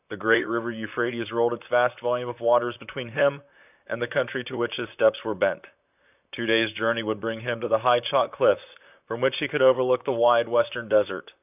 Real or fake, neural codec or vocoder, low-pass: fake; vocoder, 44.1 kHz, 128 mel bands, Pupu-Vocoder; 3.6 kHz